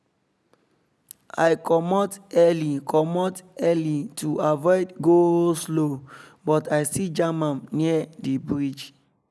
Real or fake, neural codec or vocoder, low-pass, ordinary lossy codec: real; none; none; none